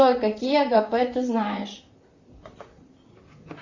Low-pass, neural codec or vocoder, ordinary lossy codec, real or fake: 7.2 kHz; vocoder, 44.1 kHz, 128 mel bands, Pupu-Vocoder; Opus, 64 kbps; fake